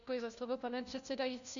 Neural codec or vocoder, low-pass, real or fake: codec, 16 kHz, 1 kbps, FunCodec, trained on LibriTTS, 50 frames a second; 7.2 kHz; fake